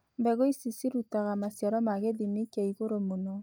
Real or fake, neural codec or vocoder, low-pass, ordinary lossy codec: real; none; none; none